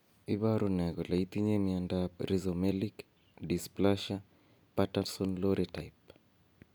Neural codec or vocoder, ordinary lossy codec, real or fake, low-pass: none; none; real; none